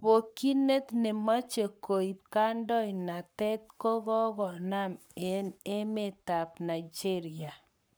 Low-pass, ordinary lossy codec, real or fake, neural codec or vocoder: none; none; fake; codec, 44.1 kHz, 7.8 kbps, Pupu-Codec